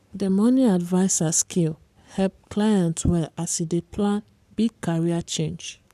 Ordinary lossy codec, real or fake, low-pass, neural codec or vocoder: none; fake; 14.4 kHz; codec, 44.1 kHz, 7.8 kbps, Pupu-Codec